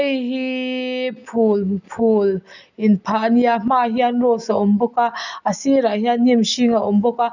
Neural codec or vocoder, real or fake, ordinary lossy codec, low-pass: none; real; none; 7.2 kHz